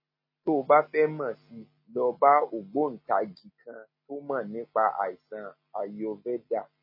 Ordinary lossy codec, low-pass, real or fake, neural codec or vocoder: MP3, 24 kbps; 5.4 kHz; real; none